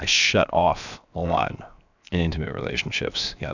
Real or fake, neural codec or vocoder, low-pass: fake; codec, 16 kHz, 0.7 kbps, FocalCodec; 7.2 kHz